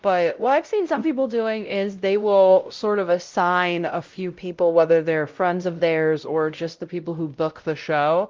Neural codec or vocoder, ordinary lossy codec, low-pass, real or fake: codec, 16 kHz, 0.5 kbps, X-Codec, WavLM features, trained on Multilingual LibriSpeech; Opus, 32 kbps; 7.2 kHz; fake